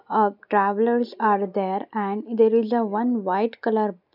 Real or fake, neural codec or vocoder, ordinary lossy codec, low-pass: real; none; none; 5.4 kHz